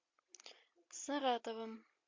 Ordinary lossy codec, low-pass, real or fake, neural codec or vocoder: MP3, 48 kbps; 7.2 kHz; real; none